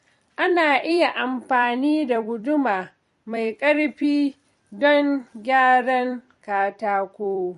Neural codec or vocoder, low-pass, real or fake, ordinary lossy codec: vocoder, 44.1 kHz, 128 mel bands every 256 samples, BigVGAN v2; 14.4 kHz; fake; MP3, 48 kbps